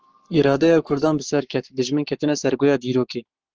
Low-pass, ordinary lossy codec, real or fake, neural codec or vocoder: 7.2 kHz; Opus, 16 kbps; fake; codec, 44.1 kHz, 7.8 kbps, Pupu-Codec